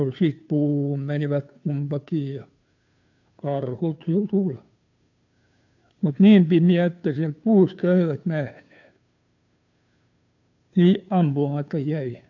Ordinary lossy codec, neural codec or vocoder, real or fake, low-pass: AAC, 48 kbps; codec, 16 kHz, 2 kbps, FunCodec, trained on Chinese and English, 25 frames a second; fake; 7.2 kHz